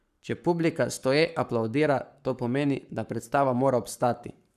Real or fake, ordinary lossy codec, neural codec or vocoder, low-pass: fake; none; codec, 44.1 kHz, 7.8 kbps, Pupu-Codec; 14.4 kHz